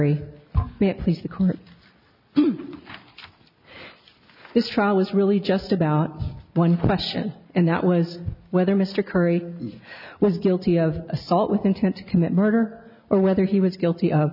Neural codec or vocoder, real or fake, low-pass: none; real; 5.4 kHz